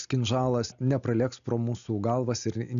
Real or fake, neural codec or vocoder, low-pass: real; none; 7.2 kHz